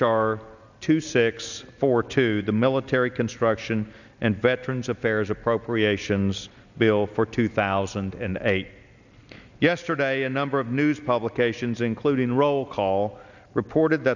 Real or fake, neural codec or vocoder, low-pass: real; none; 7.2 kHz